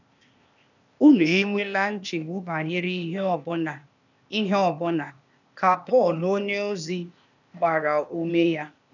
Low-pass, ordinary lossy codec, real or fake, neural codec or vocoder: 7.2 kHz; none; fake; codec, 16 kHz, 0.8 kbps, ZipCodec